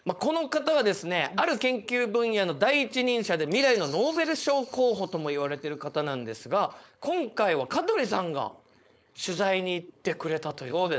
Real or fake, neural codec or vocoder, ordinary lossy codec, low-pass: fake; codec, 16 kHz, 4.8 kbps, FACodec; none; none